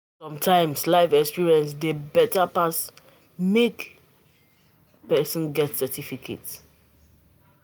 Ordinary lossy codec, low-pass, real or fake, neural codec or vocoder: none; none; real; none